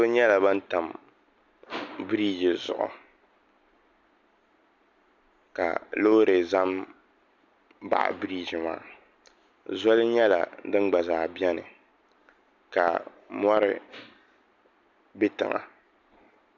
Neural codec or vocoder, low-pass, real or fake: none; 7.2 kHz; real